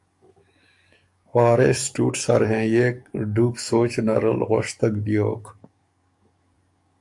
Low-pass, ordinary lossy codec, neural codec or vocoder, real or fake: 10.8 kHz; AAC, 64 kbps; codec, 44.1 kHz, 7.8 kbps, DAC; fake